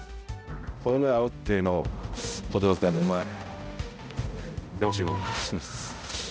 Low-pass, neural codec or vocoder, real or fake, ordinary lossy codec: none; codec, 16 kHz, 0.5 kbps, X-Codec, HuBERT features, trained on balanced general audio; fake; none